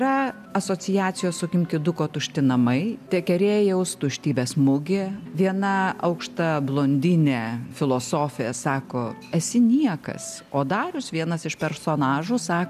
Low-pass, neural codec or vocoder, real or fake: 14.4 kHz; none; real